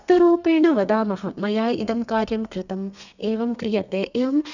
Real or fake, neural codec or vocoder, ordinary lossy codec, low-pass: fake; codec, 32 kHz, 1.9 kbps, SNAC; none; 7.2 kHz